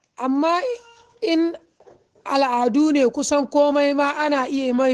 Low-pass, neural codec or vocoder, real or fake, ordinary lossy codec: 10.8 kHz; codec, 24 kHz, 3.1 kbps, DualCodec; fake; Opus, 16 kbps